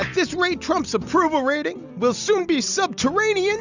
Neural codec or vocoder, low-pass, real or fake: none; 7.2 kHz; real